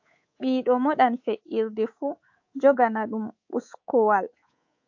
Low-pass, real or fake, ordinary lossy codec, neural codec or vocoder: 7.2 kHz; fake; AAC, 48 kbps; codec, 24 kHz, 3.1 kbps, DualCodec